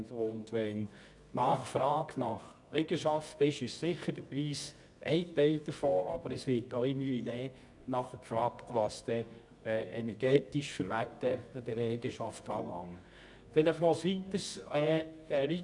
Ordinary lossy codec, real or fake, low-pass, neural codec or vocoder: none; fake; 10.8 kHz; codec, 24 kHz, 0.9 kbps, WavTokenizer, medium music audio release